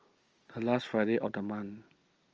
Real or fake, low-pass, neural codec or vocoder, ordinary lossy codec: real; 7.2 kHz; none; Opus, 24 kbps